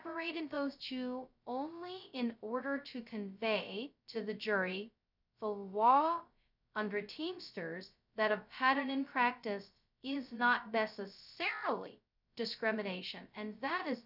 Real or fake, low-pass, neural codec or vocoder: fake; 5.4 kHz; codec, 16 kHz, 0.2 kbps, FocalCodec